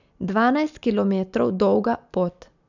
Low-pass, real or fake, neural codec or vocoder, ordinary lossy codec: 7.2 kHz; real; none; none